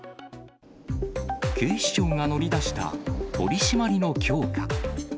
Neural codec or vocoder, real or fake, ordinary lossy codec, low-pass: none; real; none; none